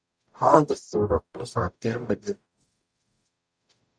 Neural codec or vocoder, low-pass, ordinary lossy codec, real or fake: codec, 44.1 kHz, 0.9 kbps, DAC; 9.9 kHz; Opus, 64 kbps; fake